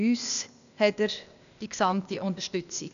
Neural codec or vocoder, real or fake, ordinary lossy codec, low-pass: codec, 16 kHz, 0.8 kbps, ZipCodec; fake; none; 7.2 kHz